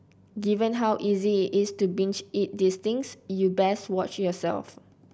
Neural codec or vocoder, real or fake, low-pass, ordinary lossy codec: none; real; none; none